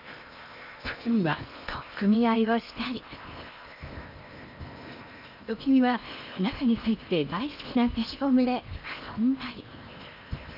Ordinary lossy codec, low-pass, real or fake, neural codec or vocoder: none; 5.4 kHz; fake; codec, 16 kHz in and 24 kHz out, 0.8 kbps, FocalCodec, streaming, 65536 codes